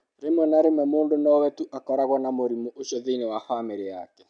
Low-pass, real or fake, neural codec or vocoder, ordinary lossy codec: 9.9 kHz; real; none; none